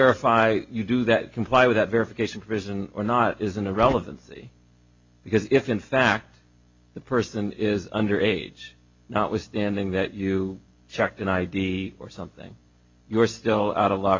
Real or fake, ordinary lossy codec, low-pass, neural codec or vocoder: real; MP3, 48 kbps; 7.2 kHz; none